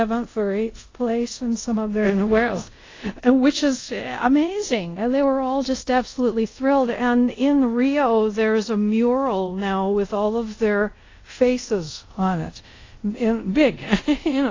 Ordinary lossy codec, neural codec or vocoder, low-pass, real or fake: AAC, 32 kbps; codec, 24 kHz, 0.5 kbps, DualCodec; 7.2 kHz; fake